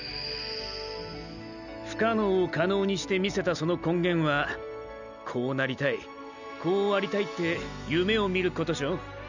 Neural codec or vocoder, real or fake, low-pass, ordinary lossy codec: none; real; 7.2 kHz; none